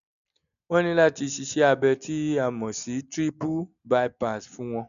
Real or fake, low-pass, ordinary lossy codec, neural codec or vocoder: real; 7.2 kHz; none; none